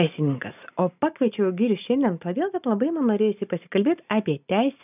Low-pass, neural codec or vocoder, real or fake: 3.6 kHz; none; real